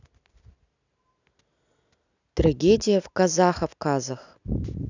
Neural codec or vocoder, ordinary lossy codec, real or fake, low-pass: none; none; real; 7.2 kHz